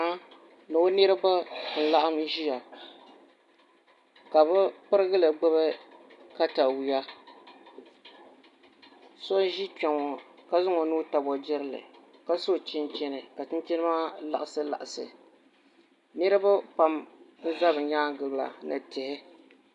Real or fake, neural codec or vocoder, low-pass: real; none; 10.8 kHz